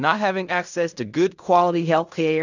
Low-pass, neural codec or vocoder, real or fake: 7.2 kHz; codec, 16 kHz in and 24 kHz out, 0.4 kbps, LongCat-Audio-Codec, fine tuned four codebook decoder; fake